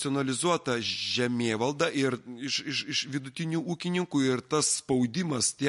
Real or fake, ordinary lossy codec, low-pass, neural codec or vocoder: real; MP3, 48 kbps; 14.4 kHz; none